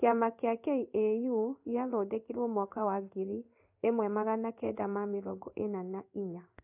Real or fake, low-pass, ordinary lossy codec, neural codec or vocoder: fake; 3.6 kHz; AAC, 32 kbps; codec, 16 kHz in and 24 kHz out, 1 kbps, XY-Tokenizer